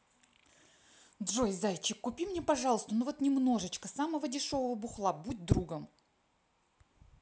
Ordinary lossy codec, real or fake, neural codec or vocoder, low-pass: none; real; none; none